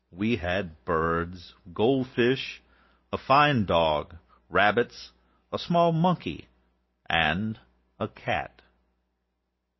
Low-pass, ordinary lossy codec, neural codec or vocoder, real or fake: 7.2 kHz; MP3, 24 kbps; none; real